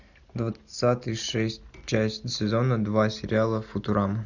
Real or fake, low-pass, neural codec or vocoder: real; 7.2 kHz; none